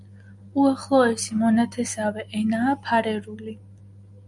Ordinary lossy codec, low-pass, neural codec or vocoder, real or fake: MP3, 96 kbps; 10.8 kHz; none; real